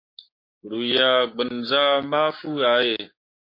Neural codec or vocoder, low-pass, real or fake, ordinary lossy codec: codec, 16 kHz, 6 kbps, DAC; 5.4 kHz; fake; MP3, 32 kbps